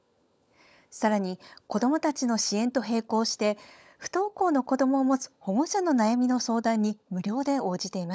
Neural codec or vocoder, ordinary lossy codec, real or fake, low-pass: codec, 16 kHz, 16 kbps, FunCodec, trained on LibriTTS, 50 frames a second; none; fake; none